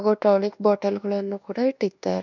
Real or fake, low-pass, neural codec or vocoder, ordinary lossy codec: fake; 7.2 kHz; codec, 24 kHz, 1.2 kbps, DualCodec; none